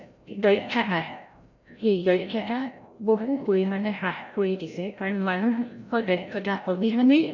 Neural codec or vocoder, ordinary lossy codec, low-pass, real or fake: codec, 16 kHz, 0.5 kbps, FreqCodec, larger model; none; 7.2 kHz; fake